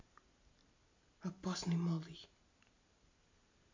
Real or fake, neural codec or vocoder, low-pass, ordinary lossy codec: real; none; 7.2 kHz; MP3, 48 kbps